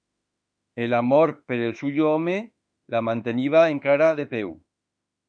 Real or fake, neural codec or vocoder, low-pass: fake; autoencoder, 48 kHz, 32 numbers a frame, DAC-VAE, trained on Japanese speech; 9.9 kHz